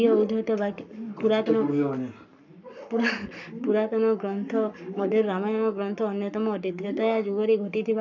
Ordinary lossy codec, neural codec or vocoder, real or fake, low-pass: none; codec, 44.1 kHz, 7.8 kbps, Pupu-Codec; fake; 7.2 kHz